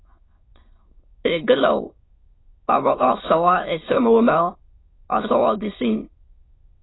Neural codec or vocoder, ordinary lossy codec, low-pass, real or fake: autoencoder, 22.05 kHz, a latent of 192 numbers a frame, VITS, trained on many speakers; AAC, 16 kbps; 7.2 kHz; fake